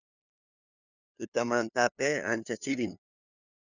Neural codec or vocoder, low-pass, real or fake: codec, 16 kHz, 2 kbps, FunCodec, trained on LibriTTS, 25 frames a second; 7.2 kHz; fake